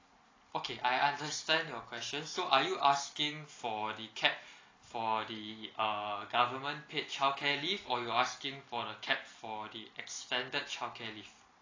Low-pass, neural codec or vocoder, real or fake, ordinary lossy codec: 7.2 kHz; none; real; AAC, 32 kbps